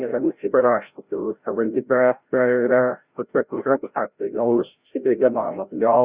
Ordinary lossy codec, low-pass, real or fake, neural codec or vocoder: Opus, 64 kbps; 3.6 kHz; fake; codec, 16 kHz, 0.5 kbps, FreqCodec, larger model